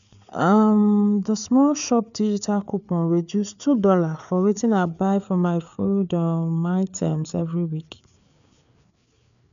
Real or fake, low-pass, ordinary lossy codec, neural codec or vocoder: fake; 7.2 kHz; none; codec, 16 kHz, 4 kbps, FreqCodec, larger model